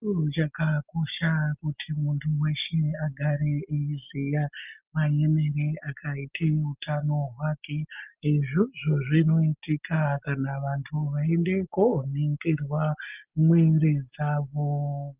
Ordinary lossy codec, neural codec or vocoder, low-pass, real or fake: Opus, 24 kbps; none; 3.6 kHz; real